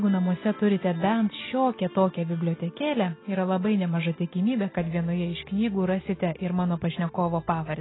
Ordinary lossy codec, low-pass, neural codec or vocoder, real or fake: AAC, 16 kbps; 7.2 kHz; none; real